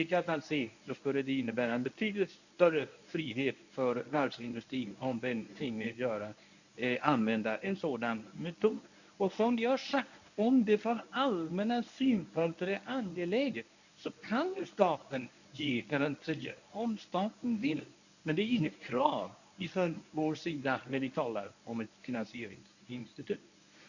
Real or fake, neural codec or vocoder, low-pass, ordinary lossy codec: fake; codec, 24 kHz, 0.9 kbps, WavTokenizer, medium speech release version 1; 7.2 kHz; none